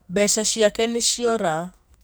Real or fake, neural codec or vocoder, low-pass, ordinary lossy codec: fake; codec, 44.1 kHz, 2.6 kbps, SNAC; none; none